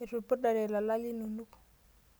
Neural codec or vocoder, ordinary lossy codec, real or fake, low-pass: none; none; real; none